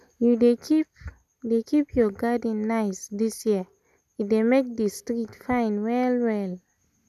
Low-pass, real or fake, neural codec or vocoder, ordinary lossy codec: 14.4 kHz; real; none; none